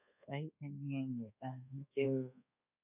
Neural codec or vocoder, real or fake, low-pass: codec, 24 kHz, 1.2 kbps, DualCodec; fake; 3.6 kHz